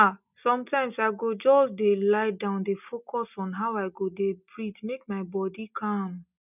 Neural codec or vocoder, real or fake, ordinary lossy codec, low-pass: none; real; none; 3.6 kHz